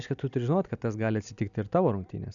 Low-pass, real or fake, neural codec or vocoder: 7.2 kHz; real; none